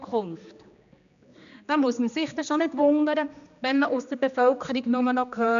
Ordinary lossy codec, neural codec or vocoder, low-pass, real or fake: none; codec, 16 kHz, 2 kbps, X-Codec, HuBERT features, trained on general audio; 7.2 kHz; fake